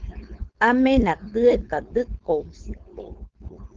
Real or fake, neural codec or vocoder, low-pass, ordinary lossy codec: fake; codec, 16 kHz, 4.8 kbps, FACodec; 7.2 kHz; Opus, 16 kbps